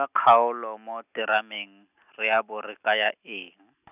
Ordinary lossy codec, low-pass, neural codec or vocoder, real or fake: none; 3.6 kHz; none; real